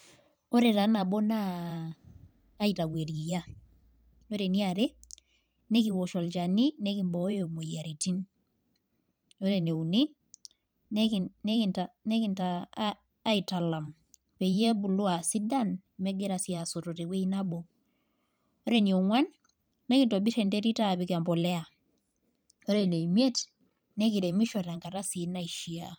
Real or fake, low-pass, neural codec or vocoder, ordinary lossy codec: fake; none; vocoder, 44.1 kHz, 128 mel bands every 512 samples, BigVGAN v2; none